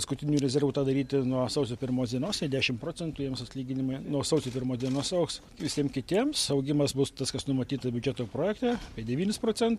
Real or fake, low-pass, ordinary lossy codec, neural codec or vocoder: real; 19.8 kHz; MP3, 64 kbps; none